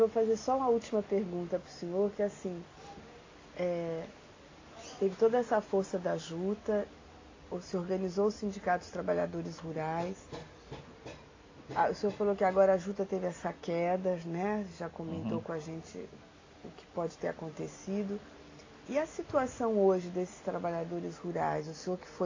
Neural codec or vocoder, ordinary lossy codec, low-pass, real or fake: none; AAC, 32 kbps; 7.2 kHz; real